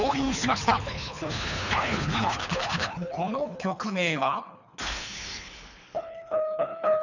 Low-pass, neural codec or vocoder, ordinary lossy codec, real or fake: 7.2 kHz; codec, 24 kHz, 3 kbps, HILCodec; none; fake